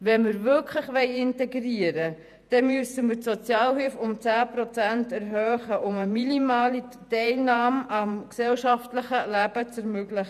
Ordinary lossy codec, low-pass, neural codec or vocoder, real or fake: none; 14.4 kHz; vocoder, 48 kHz, 128 mel bands, Vocos; fake